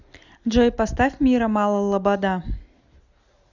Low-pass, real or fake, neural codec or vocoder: 7.2 kHz; real; none